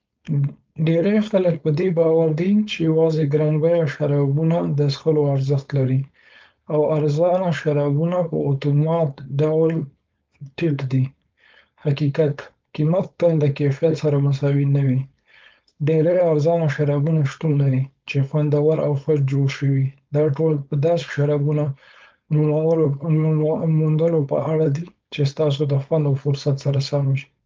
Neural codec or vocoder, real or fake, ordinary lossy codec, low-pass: codec, 16 kHz, 4.8 kbps, FACodec; fake; Opus, 24 kbps; 7.2 kHz